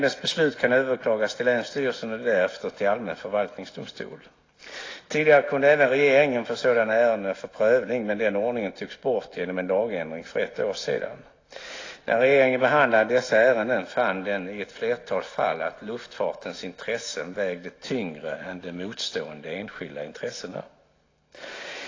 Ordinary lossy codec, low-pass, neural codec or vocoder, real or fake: AAC, 32 kbps; 7.2 kHz; none; real